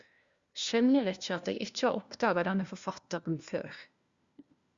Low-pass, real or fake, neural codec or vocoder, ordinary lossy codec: 7.2 kHz; fake; codec, 16 kHz, 1 kbps, FunCodec, trained on LibriTTS, 50 frames a second; Opus, 64 kbps